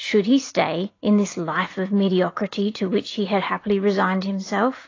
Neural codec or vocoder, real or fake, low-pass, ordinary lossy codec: none; real; 7.2 kHz; AAC, 32 kbps